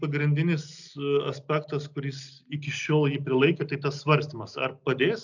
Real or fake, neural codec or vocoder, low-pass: real; none; 7.2 kHz